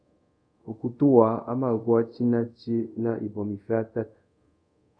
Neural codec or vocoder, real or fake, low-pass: codec, 24 kHz, 0.5 kbps, DualCodec; fake; 9.9 kHz